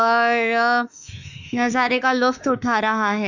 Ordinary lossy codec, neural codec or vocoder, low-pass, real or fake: none; autoencoder, 48 kHz, 32 numbers a frame, DAC-VAE, trained on Japanese speech; 7.2 kHz; fake